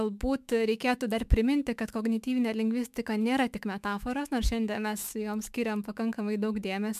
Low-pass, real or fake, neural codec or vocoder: 14.4 kHz; fake; autoencoder, 48 kHz, 128 numbers a frame, DAC-VAE, trained on Japanese speech